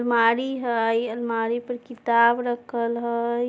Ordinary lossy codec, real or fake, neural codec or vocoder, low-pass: none; real; none; none